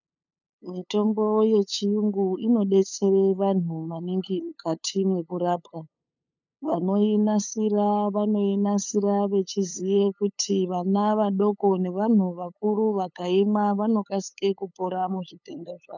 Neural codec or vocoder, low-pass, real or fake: codec, 16 kHz, 8 kbps, FunCodec, trained on LibriTTS, 25 frames a second; 7.2 kHz; fake